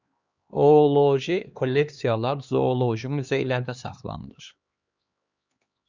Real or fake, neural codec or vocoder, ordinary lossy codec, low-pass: fake; codec, 16 kHz, 2 kbps, X-Codec, HuBERT features, trained on LibriSpeech; Opus, 64 kbps; 7.2 kHz